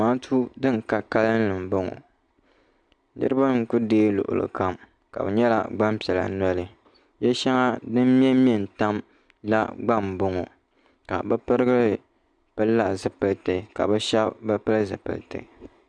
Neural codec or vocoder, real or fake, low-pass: none; real; 9.9 kHz